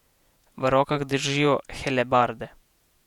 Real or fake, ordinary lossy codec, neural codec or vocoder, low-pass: fake; none; vocoder, 48 kHz, 128 mel bands, Vocos; 19.8 kHz